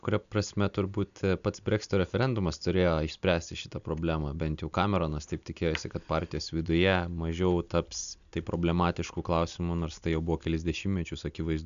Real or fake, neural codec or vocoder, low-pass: real; none; 7.2 kHz